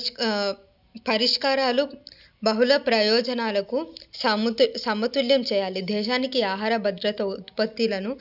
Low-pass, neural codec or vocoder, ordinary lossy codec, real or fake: 5.4 kHz; none; none; real